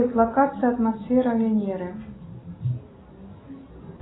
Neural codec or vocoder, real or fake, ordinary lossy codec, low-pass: none; real; AAC, 16 kbps; 7.2 kHz